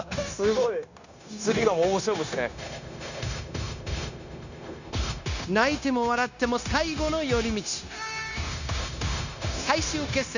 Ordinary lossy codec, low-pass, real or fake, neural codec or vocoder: none; 7.2 kHz; fake; codec, 16 kHz, 0.9 kbps, LongCat-Audio-Codec